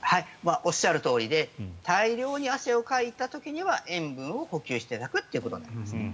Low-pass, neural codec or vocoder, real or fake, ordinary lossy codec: none; none; real; none